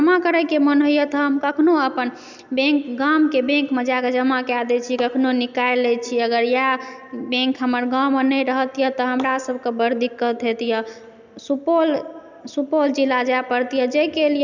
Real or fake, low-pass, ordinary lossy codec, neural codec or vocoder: real; 7.2 kHz; none; none